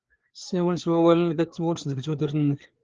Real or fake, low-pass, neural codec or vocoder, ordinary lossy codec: fake; 7.2 kHz; codec, 16 kHz, 4 kbps, FreqCodec, larger model; Opus, 16 kbps